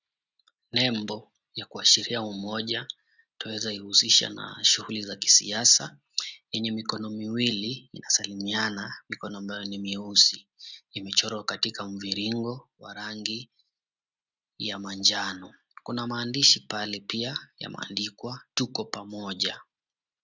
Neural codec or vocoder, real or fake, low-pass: none; real; 7.2 kHz